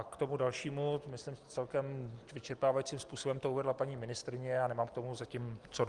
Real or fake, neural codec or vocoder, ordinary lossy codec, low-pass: real; none; Opus, 24 kbps; 10.8 kHz